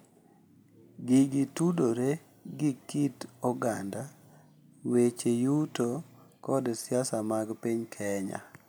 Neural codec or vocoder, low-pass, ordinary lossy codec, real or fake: none; none; none; real